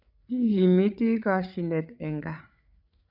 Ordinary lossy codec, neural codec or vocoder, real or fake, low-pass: none; codec, 16 kHz, 8 kbps, FreqCodec, larger model; fake; 5.4 kHz